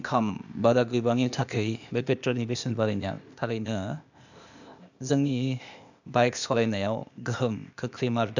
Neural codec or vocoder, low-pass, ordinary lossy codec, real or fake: codec, 16 kHz, 0.8 kbps, ZipCodec; 7.2 kHz; none; fake